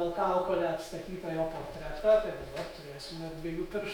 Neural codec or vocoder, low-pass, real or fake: autoencoder, 48 kHz, 128 numbers a frame, DAC-VAE, trained on Japanese speech; 19.8 kHz; fake